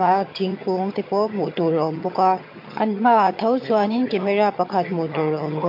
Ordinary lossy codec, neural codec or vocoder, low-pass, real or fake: MP3, 32 kbps; vocoder, 22.05 kHz, 80 mel bands, HiFi-GAN; 5.4 kHz; fake